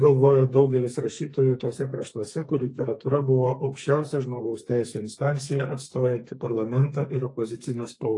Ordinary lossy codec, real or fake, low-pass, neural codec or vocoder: AAC, 48 kbps; fake; 10.8 kHz; codec, 32 kHz, 1.9 kbps, SNAC